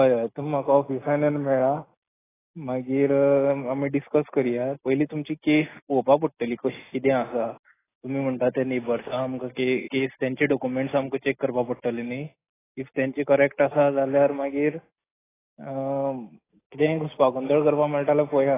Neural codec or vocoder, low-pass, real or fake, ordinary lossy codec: none; 3.6 kHz; real; AAC, 16 kbps